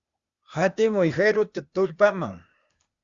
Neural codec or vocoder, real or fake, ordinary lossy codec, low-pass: codec, 16 kHz, 0.8 kbps, ZipCodec; fake; Opus, 64 kbps; 7.2 kHz